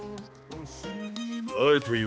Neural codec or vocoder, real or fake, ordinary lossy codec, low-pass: codec, 16 kHz, 2 kbps, X-Codec, HuBERT features, trained on balanced general audio; fake; none; none